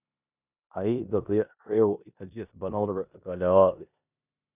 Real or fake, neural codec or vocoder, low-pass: fake; codec, 16 kHz in and 24 kHz out, 0.9 kbps, LongCat-Audio-Codec, four codebook decoder; 3.6 kHz